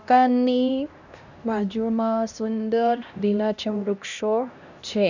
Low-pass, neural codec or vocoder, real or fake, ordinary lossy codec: 7.2 kHz; codec, 16 kHz, 1 kbps, X-Codec, HuBERT features, trained on LibriSpeech; fake; none